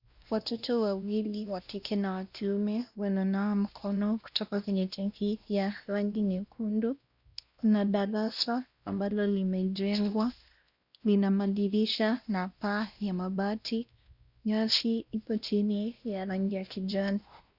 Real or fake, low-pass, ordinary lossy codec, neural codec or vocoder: fake; 5.4 kHz; Opus, 64 kbps; codec, 16 kHz, 1 kbps, X-Codec, WavLM features, trained on Multilingual LibriSpeech